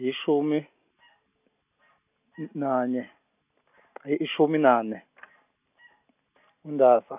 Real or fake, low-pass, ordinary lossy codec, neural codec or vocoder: real; 3.6 kHz; none; none